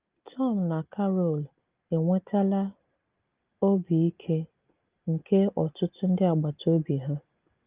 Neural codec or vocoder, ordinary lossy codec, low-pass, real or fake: none; Opus, 32 kbps; 3.6 kHz; real